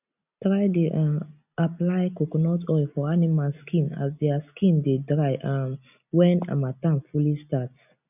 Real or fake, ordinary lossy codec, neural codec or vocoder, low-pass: real; none; none; 3.6 kHz